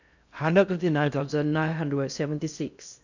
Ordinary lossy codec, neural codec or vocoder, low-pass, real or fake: none; codec, 16 kHz in and 24 kHz out, 0.6 kbps, FocalCodec, streaming, 4096 codes; 7.2 kHz; fake